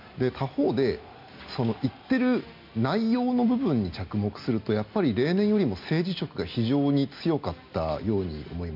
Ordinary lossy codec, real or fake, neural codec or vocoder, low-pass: MP3, 48 kbps; real; none; 5.4 kHz